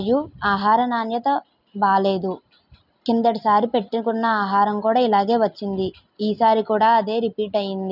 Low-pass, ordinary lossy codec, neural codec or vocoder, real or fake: 5.4 kHz; none; none; real